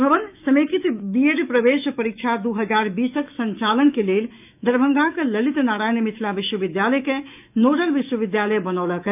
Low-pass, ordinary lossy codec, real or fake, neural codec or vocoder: 3.6 kHz; none; fake; autoencoder, 48 kHz, 128 numbers a frame, DAC-VAE, trained on Japanese speech